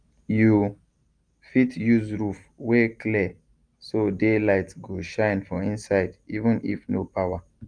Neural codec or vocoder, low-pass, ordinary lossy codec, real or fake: none; 9.9 kHz; Opus, 32 kbps; real